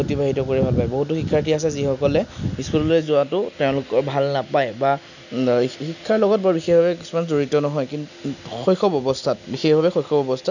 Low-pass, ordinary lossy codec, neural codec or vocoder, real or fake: 7.2 kHz; none; none; real